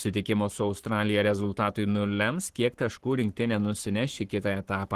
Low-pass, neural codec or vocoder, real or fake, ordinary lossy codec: 14.4 kHz; codec, 44.1 kHz, 7.8 kbps, Pupu-Codec; fake; Opus, 16 kbps